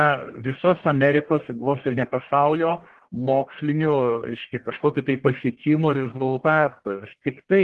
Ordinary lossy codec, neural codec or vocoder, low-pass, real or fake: Opus, 16 kbps; codec, 44.1 kHz, 1.7 kbps, Pupu-Codec; 10.8 kHz; fake